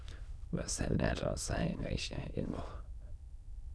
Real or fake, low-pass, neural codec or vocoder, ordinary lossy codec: fake; none; autoencoder, 22.05 kHz, a latent of 192 numbers a frame, VITS, trained on many speakers; none